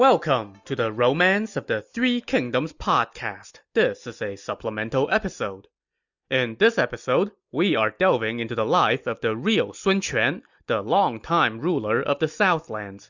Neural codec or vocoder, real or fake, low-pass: none; real; 7.2 kHz